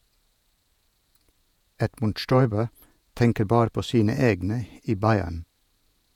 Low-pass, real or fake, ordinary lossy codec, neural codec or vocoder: 19.8 kHz; fake; none; vocoder, 48 kHz, 128 mel bands, Vocos